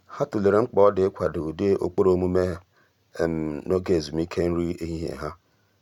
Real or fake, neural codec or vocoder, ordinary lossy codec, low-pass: real; none; none; 19.8 kHz